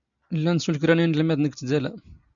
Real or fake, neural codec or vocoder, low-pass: real; none; 7.2 kHz